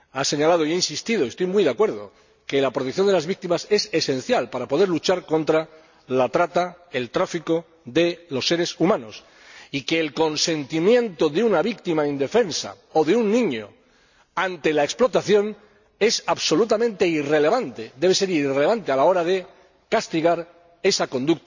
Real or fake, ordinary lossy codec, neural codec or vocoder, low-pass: real; none; none; 7.2 kHz